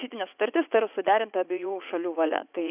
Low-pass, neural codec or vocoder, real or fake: 3.6 kHz; vocoder, 44.1 kHz, 80 mel bands, Vocos; fake